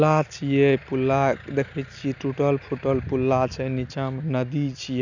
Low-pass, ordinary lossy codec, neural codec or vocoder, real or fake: 7.2 kHz; none; none; real